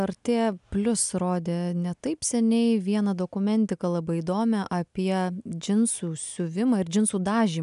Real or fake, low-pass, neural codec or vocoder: real; 10.8 kHz; none